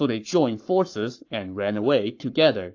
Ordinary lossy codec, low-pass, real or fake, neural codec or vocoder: AAC, 48 kbps; 7.2 kHz; fake; codec, 44.1 kHz, 7.8 kbps, Pupu-Codec